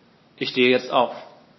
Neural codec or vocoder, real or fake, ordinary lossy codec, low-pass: none; real; MP3, 24 kbps; 7.2 kHz